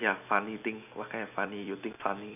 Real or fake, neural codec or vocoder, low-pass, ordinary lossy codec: real; none; 3.6 kHz; none